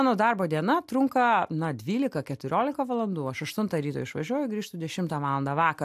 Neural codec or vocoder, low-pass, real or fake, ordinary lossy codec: none; 14.4 kHz; real; AAC, 96 kbps